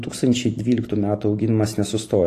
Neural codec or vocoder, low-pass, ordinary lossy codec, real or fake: vocoder, 44.1 kHz, 128 mel bands every 512 samples, BigVGAN v2; 14.4 kHz; AAC, 48 kbps; fake